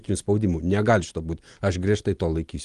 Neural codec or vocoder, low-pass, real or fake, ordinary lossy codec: none; 10.8 kHz; real; Opus, 24 kbps